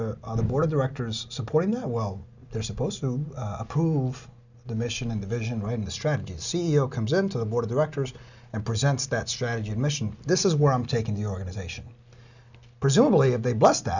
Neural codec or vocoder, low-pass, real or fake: none; 7.2 kHz; real